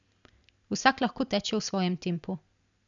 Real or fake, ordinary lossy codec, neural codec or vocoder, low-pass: real; none; none; 7.2 kHz